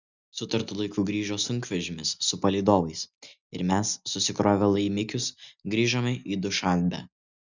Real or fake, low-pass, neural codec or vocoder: real; 7.2 kHz; none